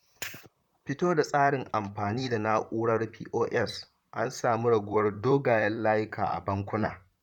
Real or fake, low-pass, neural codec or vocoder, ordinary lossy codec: fake; 19.8 kHz; vocoder, 44.1 kHz, 128 mel bands, Pupu-Vocoder; none